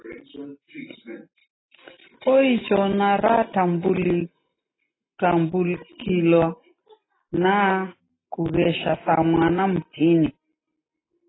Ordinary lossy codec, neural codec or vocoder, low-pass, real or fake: AAC, 16 kbps; none; 7.2 kHz; real